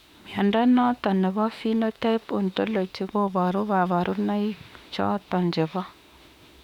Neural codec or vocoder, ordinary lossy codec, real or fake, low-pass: autoencoder, 48 kHz, 32 numbers a frame, DAC-VAE, trained on Japanese speech; none; fake; 19.8 kHz